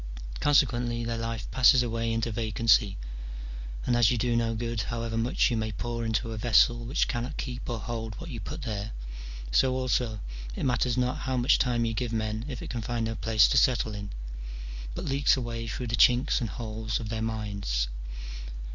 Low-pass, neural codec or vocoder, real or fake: 7.2 kHz; none; real